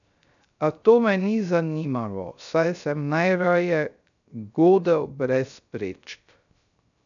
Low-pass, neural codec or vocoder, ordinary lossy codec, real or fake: 7.2 kHz; codec, 16 kHz, 0.3 kbps, FocalCodec; none; fake